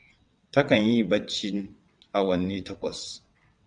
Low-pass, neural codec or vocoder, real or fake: 9.9 kHz; vocoder, 22.05 kHz, 80 mel bands, WaveNeXt; fake